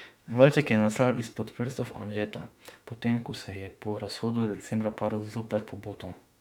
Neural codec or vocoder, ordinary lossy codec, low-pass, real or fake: autoencoder, 48 kHz, 32 numbers a frame, DAC-VAE, trained on Japanese speech; none; 19.8 kHz; fake